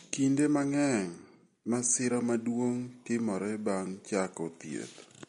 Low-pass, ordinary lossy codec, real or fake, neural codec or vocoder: 19.8 kHz; MP3, 48 kbps; real; none